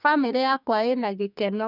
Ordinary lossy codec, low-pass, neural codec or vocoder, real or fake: none; 5.4 kHz; codec, 44.1 kHz, 2.6 kbps, SNAC; fake